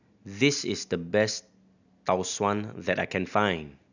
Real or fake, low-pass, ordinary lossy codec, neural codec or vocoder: real; 7.2 kHz; none; none